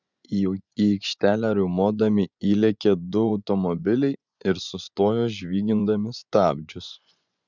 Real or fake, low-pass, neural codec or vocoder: fake; 7.2 kHz; vocoder, 24 kHz, 100 mel bands, Vocos